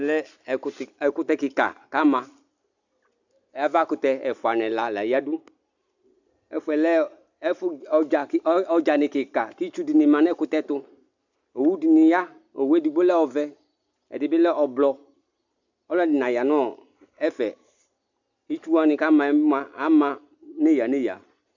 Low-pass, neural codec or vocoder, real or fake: 7.2 kHz; none; real